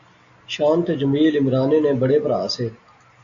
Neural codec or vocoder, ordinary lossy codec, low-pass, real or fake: none; AAC, 64 kbps; 7.2 kHz; real